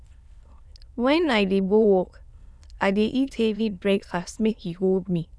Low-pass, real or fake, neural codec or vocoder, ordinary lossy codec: none; fake; autoencoder, 22.05 kHz, a latent of 192 numbers a frame, VITS, trained on many speakers; none